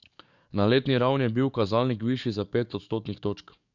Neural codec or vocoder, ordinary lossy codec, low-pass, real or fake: codec, 44.1 kHz, 7.8 kbps, DAC; none; 7.2 kHz; fake